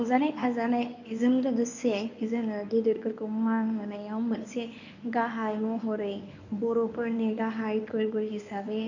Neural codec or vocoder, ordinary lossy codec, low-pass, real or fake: codec, 24 kHz, 0.9 kbps, WavTokenizer, medium speech release version 1; none; 7.2 kHz; fake